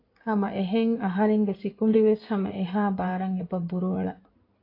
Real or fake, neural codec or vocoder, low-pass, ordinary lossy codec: fake; codec, 16 kHz in and 24 kHz out, 2.2 kbps, FireRedTTS-2 codec; 5.4 kHz; AAC, 32 kbps